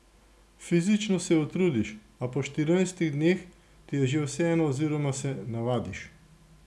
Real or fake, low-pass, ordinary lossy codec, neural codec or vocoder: real; none; none; none